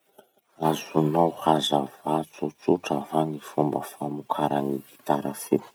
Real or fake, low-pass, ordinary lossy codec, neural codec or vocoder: real; none; none; none